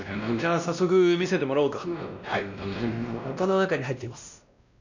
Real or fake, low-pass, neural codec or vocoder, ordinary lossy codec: fake; 7.2 kHz; codec, 16 kHz, 1 kbps, X-Codec, WavLM features, trained on Multilingual LibriSpeech; none